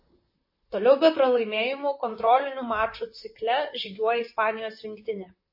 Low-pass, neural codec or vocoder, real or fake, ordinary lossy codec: 5.4 kHz; vocoder, 44.1 kHz, 128 mel bands, Pupu-Vocoder; fake; MP3, 24 kbps